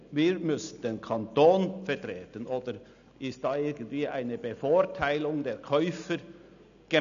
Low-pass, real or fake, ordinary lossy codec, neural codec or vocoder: 7.2 kHz; real; none; none